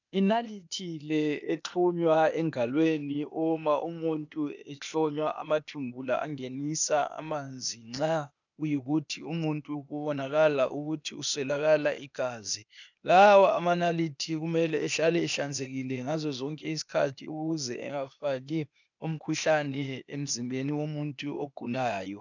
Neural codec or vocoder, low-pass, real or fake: codec, 16 kHz, 0.8 kbps, ZipCodec; 7.2 kHz; fake